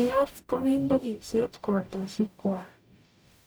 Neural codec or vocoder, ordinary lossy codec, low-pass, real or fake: codec, 44.1 kHz, 0.9 kbps, DAC; none; none; fake